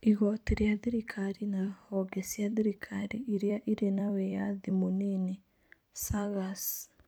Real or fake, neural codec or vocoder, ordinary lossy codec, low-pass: fake; vocoder, 44.1 kHz, 128 mel bands every 256 samples, BigVGAN v2; none; none